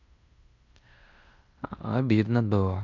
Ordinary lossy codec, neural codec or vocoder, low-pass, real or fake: none; codec, 16 kHz in and 24 kHz out, 0.9 kbps, LongCat-Audio-Codec, fine tuned four codebook decoder; 7.2 kHz; fake